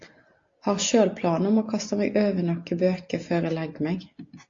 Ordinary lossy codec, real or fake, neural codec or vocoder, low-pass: AAC, 48 kbps; real; none; 7.2 kHz